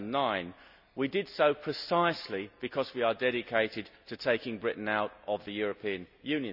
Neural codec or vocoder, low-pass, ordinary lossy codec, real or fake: none; 5.4 kHz; none; real